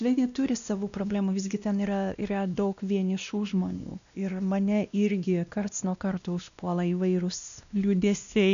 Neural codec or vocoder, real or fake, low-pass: codec, 16 kHz, 1 kbps, X-Codec, WavLM features, trained on Multilingual LibriSpeech; fake; 7.2 kHz